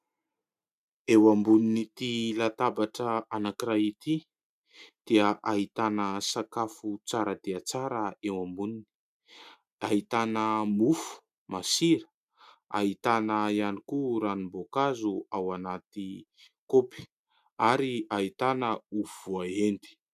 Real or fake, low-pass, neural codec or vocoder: real; 14.4 kHz; none